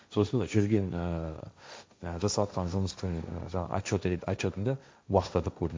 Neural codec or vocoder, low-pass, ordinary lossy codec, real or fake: codec, 16 kHz, 1.1 kbps, Voila-Tokenizer; none; none; fake